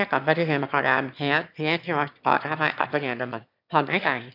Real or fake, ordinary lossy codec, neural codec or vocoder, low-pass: fake; none; autoencoder, 22.05 kHz, a latent of 192 numbers a frame, VITS, trained on one speaker; 5.4 kHz